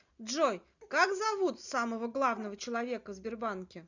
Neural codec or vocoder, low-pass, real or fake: none; 7.2 kHz; real